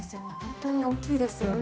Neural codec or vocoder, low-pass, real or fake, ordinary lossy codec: codec, 16 kHz, 1 kbps, X-Codec, HuBERT features, trained on general audio; none; fake; none